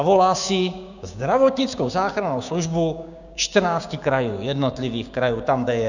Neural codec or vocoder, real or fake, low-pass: codec, 16 kHz, 6 kbps, DAC; fake; 7.2 kHz